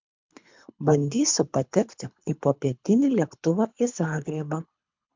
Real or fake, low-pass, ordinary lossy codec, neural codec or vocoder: fake; 7.2 kHz; MP3, 64 kbps; codec, 24 kHz, 3 kbps, HILCodec